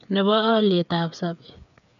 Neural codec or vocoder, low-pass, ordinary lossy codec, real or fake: codec, 16 kHz, 8 kbps, FreqCodec, smaller model; 7.2 kHz; none; fake